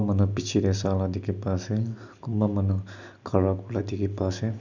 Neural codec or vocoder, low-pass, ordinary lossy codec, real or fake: none; 7.2 kHz; none; real